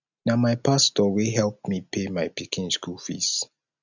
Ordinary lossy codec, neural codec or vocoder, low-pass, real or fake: none; none; 7.2 kHz; real